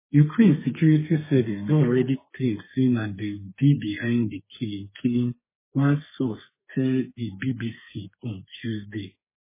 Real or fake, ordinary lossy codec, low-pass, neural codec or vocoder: fake; MP3, 16 kbps; 3.6 kHz; codec, 32 kHz, 1.9 kbps, SNAC